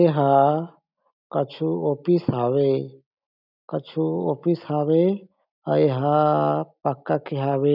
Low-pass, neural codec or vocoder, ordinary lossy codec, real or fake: 5.4 kHz; none; none; real